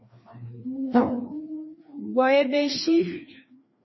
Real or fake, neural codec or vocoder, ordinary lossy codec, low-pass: fake; codec, 16 kHz, 2 kbps, FreqCodec, larger model; MP3, 24 kbps; 7.2 kHz